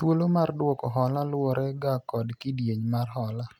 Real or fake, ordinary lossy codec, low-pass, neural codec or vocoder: real; none; 19.8 kHz; none